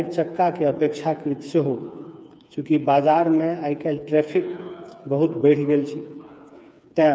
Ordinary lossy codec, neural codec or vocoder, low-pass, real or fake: none; codec, 16 kHz, 4 kbps, FreqCodec, smaller model; none; fake